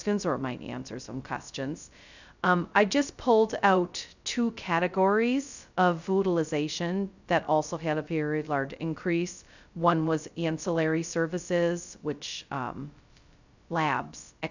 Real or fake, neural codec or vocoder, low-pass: fake; codec, 16 kHz, 0.2 kbps, FocalCodec; 7.2 kHz